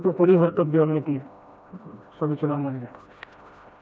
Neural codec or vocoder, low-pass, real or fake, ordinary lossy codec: codec, 16 kHz, 1 kbps, FreqCodec, smaller model; none; fake; none